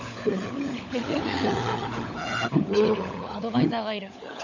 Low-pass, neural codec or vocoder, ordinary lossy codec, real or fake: 7.2 kHz; codec, 16 kHz, 16 kbps, FunCodec, trained on LibriTTS, 50 frames a second; none; fake